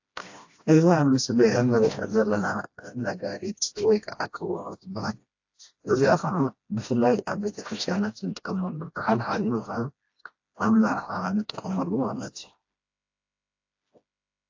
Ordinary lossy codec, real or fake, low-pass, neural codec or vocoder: AAC, 48 kbps; fake; 7.2 kHz; codec, 16 kHz, 1 kbps, FreqCodec, smaller model